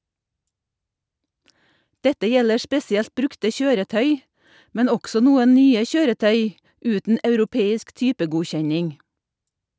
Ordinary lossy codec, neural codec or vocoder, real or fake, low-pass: none; none; real; none